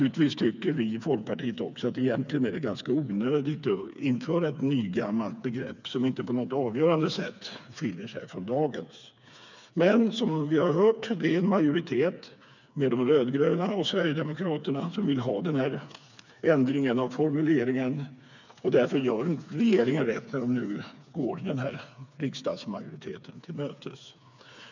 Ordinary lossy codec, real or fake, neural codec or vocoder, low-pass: none; fake; codec, 16 kHz, 4 kbps, FreqCodec, smaller model; 7.2 kHz